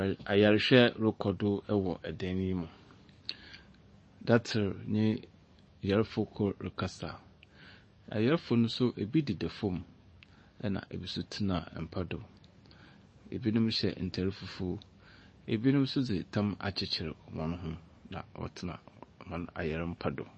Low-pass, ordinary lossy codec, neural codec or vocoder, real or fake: 9.9 kHz; MP3, 32 kbps; codec, 44.1 kHz, 7.8 kbps, DAC; fake